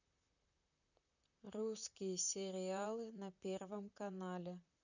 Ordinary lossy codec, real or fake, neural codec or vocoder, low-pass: none; fake; vocoder, 44.1 kHz, 128 mel bands, Pupu-Vocoder; 7.2 kHz